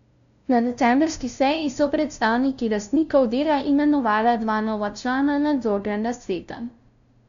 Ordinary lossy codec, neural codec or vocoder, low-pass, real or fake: MP3, 96 kbps; codec, 16 kHz, 0.5 kbps, FunCodec, trained on LibriTTS, 25 frames a second; 7.2 kHz; fake